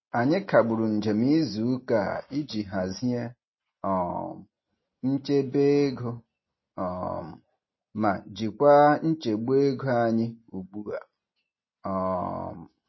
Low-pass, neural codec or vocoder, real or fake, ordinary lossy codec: 7.2 kHz; none; real; MP3, 24 kbps